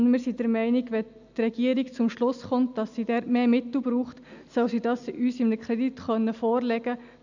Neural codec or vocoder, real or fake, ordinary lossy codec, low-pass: none; real; none; 7.2 kHz